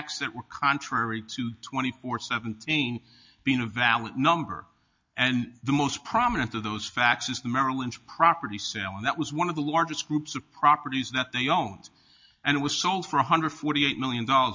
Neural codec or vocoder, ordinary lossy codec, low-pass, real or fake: none; MP3, 48 kbps; 7.2 kHz; real